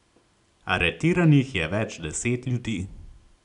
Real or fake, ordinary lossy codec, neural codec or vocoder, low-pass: real; none; none; 10.8 kHz